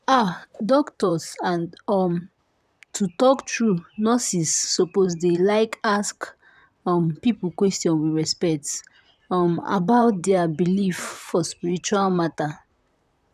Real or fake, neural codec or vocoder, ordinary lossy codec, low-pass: fake; vocoder, 44.1 kHz, 128 mel bands, Pupu-Vocoder; none; 14.4 kHz